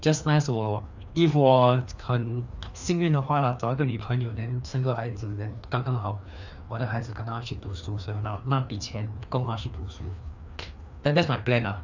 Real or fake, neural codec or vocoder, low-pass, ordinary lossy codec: fake; codec, 16 kHz, 2 kbps, FreqCodec, larger model; 7.2 kHz; none